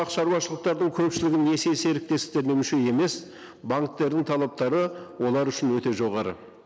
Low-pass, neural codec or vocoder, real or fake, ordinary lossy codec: none; none; real; none